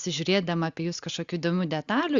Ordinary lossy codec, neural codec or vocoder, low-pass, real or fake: Opus, 64 kbps; none; 7.2 kHz; real